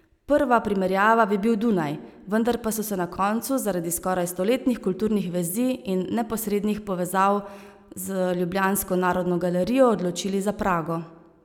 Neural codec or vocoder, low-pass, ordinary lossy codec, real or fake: none; 19.8 kHz; none; real